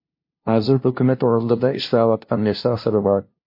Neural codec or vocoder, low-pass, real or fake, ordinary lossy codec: codec, 16 kHz, 0.5 kbps, FunCodec, trained on LibriTTS, 25 frames a second; 5.4 kHz; fake; MP3, 32 kbps